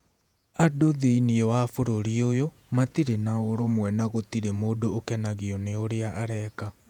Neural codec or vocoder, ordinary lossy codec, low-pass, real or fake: vocoder, 48 kHz, 128 mel bands, Vocos; none; 19.8 kHz; fake